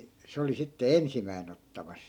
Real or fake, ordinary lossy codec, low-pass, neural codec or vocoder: real; none; 19.8 kHz; none